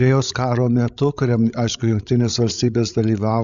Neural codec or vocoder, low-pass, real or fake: codec, 16 kHz, 16 kbps, FreqCodec, larger model; 7.2 kHz; fake